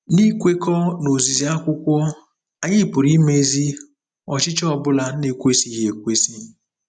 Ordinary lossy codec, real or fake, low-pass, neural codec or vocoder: none; real; 9.9 kHz; none